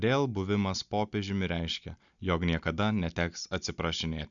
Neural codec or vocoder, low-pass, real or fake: none; 7.2 kHz; real